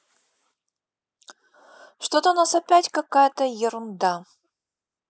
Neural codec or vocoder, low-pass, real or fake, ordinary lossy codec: none; none; real; none